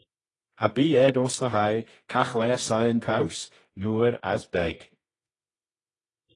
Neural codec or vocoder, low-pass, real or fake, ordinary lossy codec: codec, 24 kHz, 0.9 kbps, WavTokenizer, medium music audio release; 10.8 kHz; fake; AAC, 32 kbps